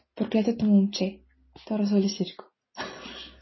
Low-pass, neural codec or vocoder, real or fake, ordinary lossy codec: 7.2 kHz; none; real; MP3, 24 kbps